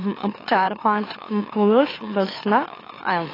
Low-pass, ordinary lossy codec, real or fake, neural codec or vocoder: 5.4 kHz; AAC, 24 kbps; fake; autoencoder, 44.1 kHz, a latent of 192 numbers a frame, MeloTTS